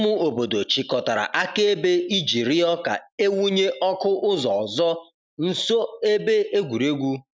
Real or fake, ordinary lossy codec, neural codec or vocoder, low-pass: real; none; none; none